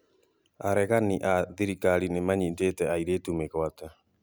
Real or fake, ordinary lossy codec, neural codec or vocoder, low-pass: real; none; none; none